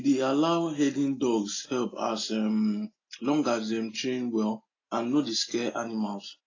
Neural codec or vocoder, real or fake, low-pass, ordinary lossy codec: none; real; 7.2 kHz; AAC, 32 kbps